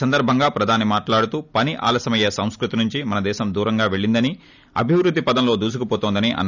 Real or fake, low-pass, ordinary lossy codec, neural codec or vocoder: real; 7.2 kHz; none; none